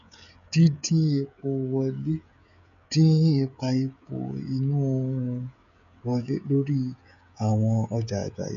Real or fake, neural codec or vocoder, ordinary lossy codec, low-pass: fake; codec, 16 kHz, 16 kbps, FreqCodec, smaller model; none; 7.2 kHz